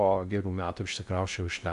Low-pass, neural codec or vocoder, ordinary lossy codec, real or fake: 10.8 kHz; codec, 16 kHz in and 24 kHz out, 0.6 kbps, FocalCodec, streaming, 2048 codes; AAC, 96 kbps; fake